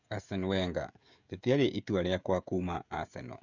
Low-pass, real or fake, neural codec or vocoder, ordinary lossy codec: 7.2 kHz; fake; codec, 16 kHz, 16 kbps, FreqCodec, smaller model; none